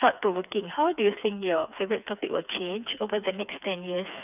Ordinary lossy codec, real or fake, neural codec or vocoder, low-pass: none; fake; codec, 16 kHz, 4 kbps, FreqCodec, smaller model; 3.6 kHz